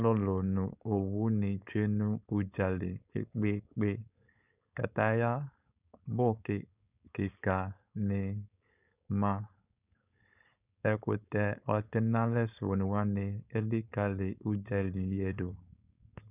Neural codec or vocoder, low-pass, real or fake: codec, 16 kHz, 4.8 kbps, FACodec; 3.6 kHz; fake